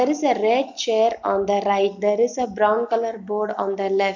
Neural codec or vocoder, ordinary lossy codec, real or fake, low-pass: none; none; real; 7.2 kHz